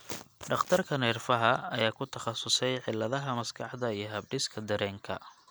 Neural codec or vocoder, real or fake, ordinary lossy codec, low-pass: none; real; none; none